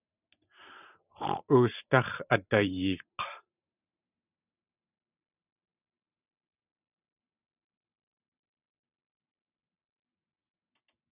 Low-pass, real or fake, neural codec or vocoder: 3.6 kHz; fake; vocoder, 44.1 kHz, 128 mel bands every 512 samples, BigVGAN v2